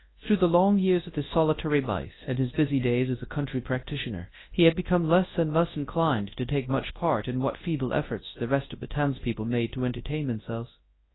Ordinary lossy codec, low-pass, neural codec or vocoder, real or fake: AAC, 16 kbps; 7.2 kHz; codec, 24 kHz, 0.9 kbps, WavTokenizer, large speech release; fake